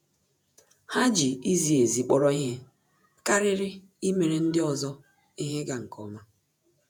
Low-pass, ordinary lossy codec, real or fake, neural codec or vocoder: none; none; fake; vocoder, 48 kHz, 128 mel bands, Vocos